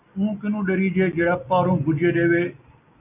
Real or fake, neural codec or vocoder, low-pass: real; none; 3.6 kHz